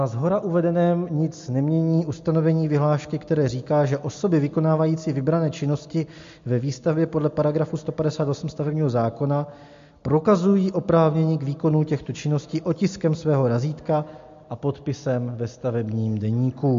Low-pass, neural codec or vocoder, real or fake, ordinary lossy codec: 7.2 kHz; none; real; MP3, 48 kbps